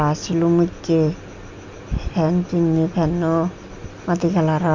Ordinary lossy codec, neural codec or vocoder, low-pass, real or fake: MP3, 64 kbps; none; 7.2 kHz; real